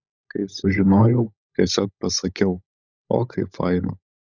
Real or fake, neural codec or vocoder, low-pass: fake; codec, 16 kHz, 16 kbps, FunCodec, trained on LibriTTS, 50 frames a second; 7.2 kHz